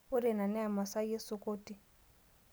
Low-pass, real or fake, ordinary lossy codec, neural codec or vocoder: none; real; none; none